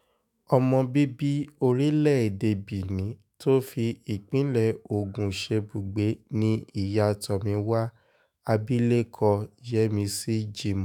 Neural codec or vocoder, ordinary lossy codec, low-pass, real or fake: autoencoder, 48 kHz, 128 numbers a frame, DAC-VAE, trained on Japanese speech; none; 19.8 kHz; fake